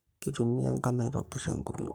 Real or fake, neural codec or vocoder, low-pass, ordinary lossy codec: fake; codec, 44.1 kHz, 3.4 kbps, Pupu-Codec; none; none